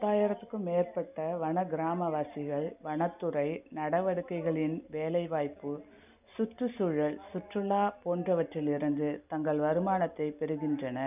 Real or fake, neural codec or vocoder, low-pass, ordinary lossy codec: real; none; 3.6 kHz; none